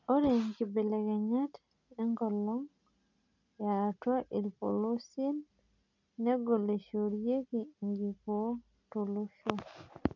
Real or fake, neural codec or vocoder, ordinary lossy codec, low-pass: real; none; none; 7.2 kHz